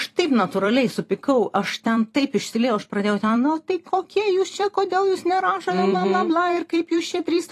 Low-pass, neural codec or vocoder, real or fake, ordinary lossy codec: 14.4 kHz; vocoder, 44.1 kHz, 128 mel bands every 256 samples, BigVGAN v2; fake; AAC, 48 kbps